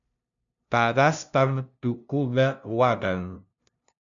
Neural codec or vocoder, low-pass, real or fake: codec, 16 kHz, 0.5 kbps, FunCodec, trained on LibriTTS, 25 frames a second; 7.2 kHz; fake